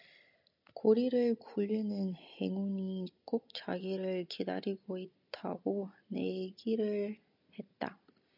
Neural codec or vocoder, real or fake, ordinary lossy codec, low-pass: none; real; MP3, 48 kbps; 5.4 kHz